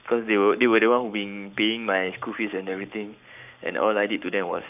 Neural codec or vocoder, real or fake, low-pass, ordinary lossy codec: codec, 44.1 kHz, 7.8 kbps, Pupu-Codec; fake; 3.6 kHz; none